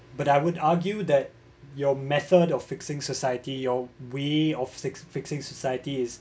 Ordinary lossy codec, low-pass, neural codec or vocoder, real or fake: none; none; none; real